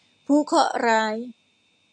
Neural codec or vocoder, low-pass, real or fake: none; 9.9 kHz; real